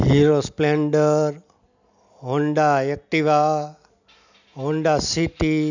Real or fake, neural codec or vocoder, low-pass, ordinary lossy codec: real; none; 7.2 kHz; none